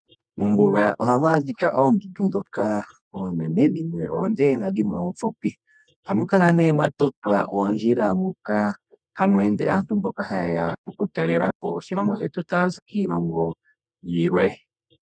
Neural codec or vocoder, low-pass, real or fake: codec, 24 kHz, 0.9 kbps, WavTokenizer, medium music audio release; 9.9 kHz; fake